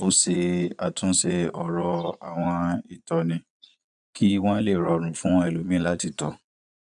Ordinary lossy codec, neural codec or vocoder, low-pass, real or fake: none; vocoder, 22.05 kHz, 80 mel bands, Vocos; 9.9 kHz; fake